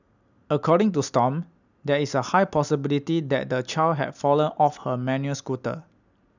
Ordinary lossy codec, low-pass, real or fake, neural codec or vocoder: none; 7.2 kHz; real; none